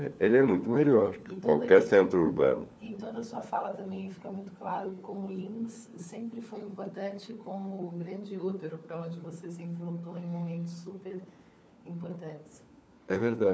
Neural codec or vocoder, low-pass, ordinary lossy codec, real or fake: codec, 16 kHz, 4 kbps, FunCodec, trained on LibriTTS, 50 frames a second; none; none; fake